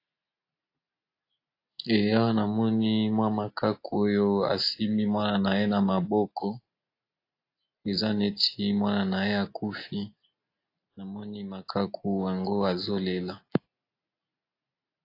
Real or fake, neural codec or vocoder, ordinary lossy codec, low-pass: real; none; AAC, 32 kbps; 5.4 kHz